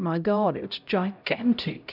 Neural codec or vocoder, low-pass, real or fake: codec, 16 kHz, 0.5 kbps, X-Codec, HuBERT features, trained on LibriSpeech; 5.4 kHz; fake